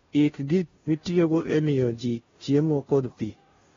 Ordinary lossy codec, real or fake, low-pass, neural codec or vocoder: AAC, 24 kbps; fake; 7.2 kHz; codec, 16 kHz, 0.5 kbps, FunCodec, trained on Chinese and English, 25 frames a second